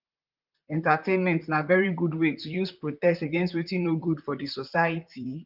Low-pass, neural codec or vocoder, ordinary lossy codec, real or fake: 5.4 kHz; vocoder, 44.1 kHz, 128 mel bands, Pupu-Vocoder; Opus, 32 kbps; fake